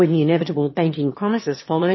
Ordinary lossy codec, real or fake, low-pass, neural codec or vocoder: MP3, 24 kbps; fake; 7.2 kHz; autoencoder, 22.05 kHz, a latent of 192 numbers a frame, VITS, trained on one speaker